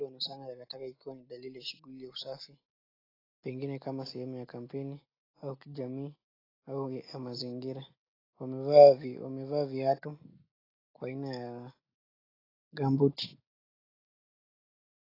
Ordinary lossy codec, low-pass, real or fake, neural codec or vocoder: AAC, 24 kbps; 5.4 kHz; real; none